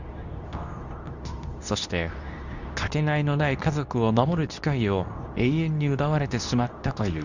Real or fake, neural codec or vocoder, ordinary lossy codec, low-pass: fake; codec, 24 kHz, 0.9 kbps, WavTokenizer, medium speech release version 2; none; 7.2 kHz